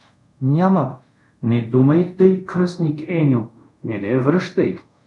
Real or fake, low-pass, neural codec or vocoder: fake; 10.8 kHz; codec, 24 kHz, 0.5 kbps, DualCodec